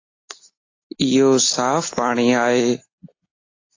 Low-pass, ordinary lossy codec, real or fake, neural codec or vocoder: 7.2 kHz; AAC, 32 kbps; real; none